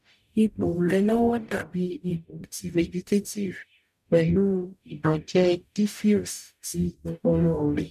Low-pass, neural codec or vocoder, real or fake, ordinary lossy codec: 14.4 kHz; codec, 44.1 kHz, 0.9 kbps, DAC; fake; none